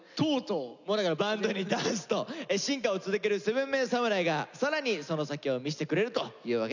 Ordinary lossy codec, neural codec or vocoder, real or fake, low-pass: none; none; real; 7.2 kHz